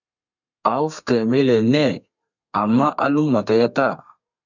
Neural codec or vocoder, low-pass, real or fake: codec, 32 kHz, 1.9 kbps, SNAC; 7.2 kHz; fake